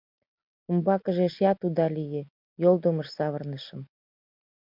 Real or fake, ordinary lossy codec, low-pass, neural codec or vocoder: real; MP3, 48 kbps; 5.4 kHz; none